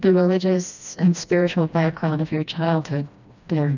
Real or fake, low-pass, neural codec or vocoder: fake; 7.2 kHz; codec, 16 kHz, 1 kbps, FreqCodec, smaller model